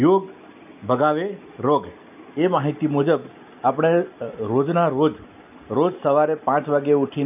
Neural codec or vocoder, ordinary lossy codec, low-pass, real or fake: none; none; 3.6 kHz; real